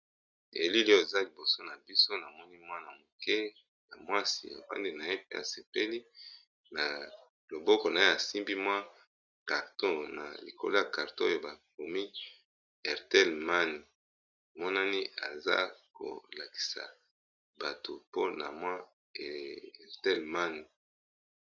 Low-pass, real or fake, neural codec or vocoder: 7.2 kHz; real; none